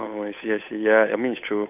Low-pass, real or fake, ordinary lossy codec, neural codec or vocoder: 3.6 kHz; fake; none; codec, 16 kHz, 8 kbps, FunCodec, trained on Chinese and English, 25 frames a second